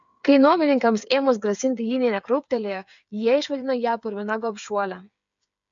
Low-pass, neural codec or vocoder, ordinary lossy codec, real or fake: 7.2 kHz; codec, 16 kHz, 8 kbps, FreqCodec, smaller model; MP3, 64 kbps; fake